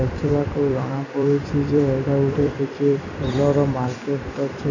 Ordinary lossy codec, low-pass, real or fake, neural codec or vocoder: none; 7.2 kHz; fake; vocoder, 44.1 kHz, 128 mel bands every 256 samples, BigVGAN v2